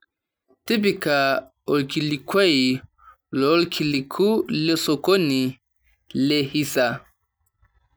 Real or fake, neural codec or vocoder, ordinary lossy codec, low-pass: real; none; none; none